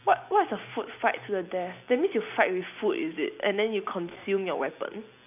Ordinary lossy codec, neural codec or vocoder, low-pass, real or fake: none; none; 3.6 kHz; real